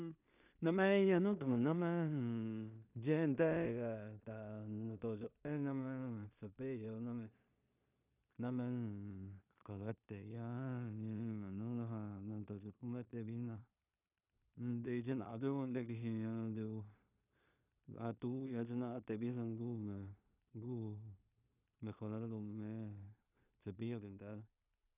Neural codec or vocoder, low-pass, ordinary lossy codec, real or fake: codec, 16 kHz in and 24 kHz out, 0.4 kbps, LongCat-Audio-Codec, two codebook decoder; 3.6 kHz; none; fake